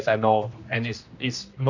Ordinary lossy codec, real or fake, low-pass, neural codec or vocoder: none; fake; 7.2 kHz; codec, 16 kHz, 1 kbps, X-Codec, HuBERT features, trained on general audio